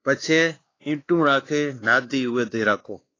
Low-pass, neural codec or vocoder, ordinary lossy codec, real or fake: 7.2 kHz; codec, 16 kHz, 2 kbps, X-Codec, WavLM features, trained on Multilingual LibriSpeech; AAC, 32 kbps; fake